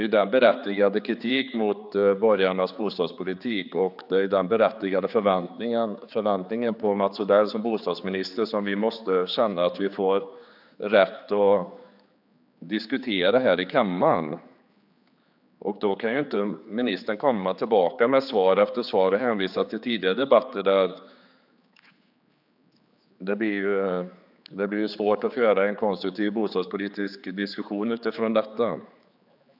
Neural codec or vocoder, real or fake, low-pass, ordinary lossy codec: codec, 16 kHz, 4 kbps, X-Codec, HuBERT features, trained on general audio; fake; 5.4 kHz; none